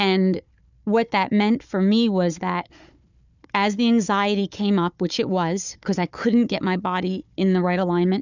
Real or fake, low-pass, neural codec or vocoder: fake; 7.2 kHz; codec, 16 kHz, 4 kbps, FunCodec, trained on Chinese and English, 50 frames a second